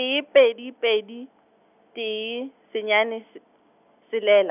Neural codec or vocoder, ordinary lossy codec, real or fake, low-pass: none; none; real; 3.6 kHz